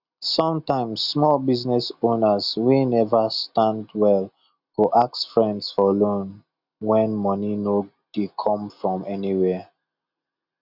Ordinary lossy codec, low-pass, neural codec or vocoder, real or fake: none; 5.4 kHz; none; real